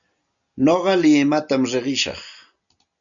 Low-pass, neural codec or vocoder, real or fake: 7.2 kHz; none; real